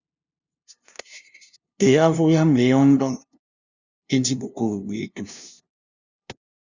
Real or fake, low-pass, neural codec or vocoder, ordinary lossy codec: fake; 7.2 kHz; codec, 16 kHz, 0.5 kbps, FunCodec, trained on LibriTTS, 25 frames a second; Opus, 64 kbps